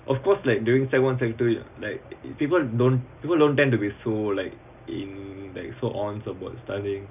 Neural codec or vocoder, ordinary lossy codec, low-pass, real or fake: none; none; 3.6 kHz; real